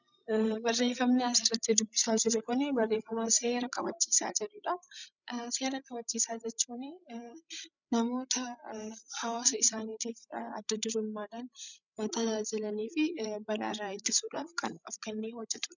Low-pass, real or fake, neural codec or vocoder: 7.2 kHz; fake; codec, 16 kHz, 16 kbps, FreqCodec, larger model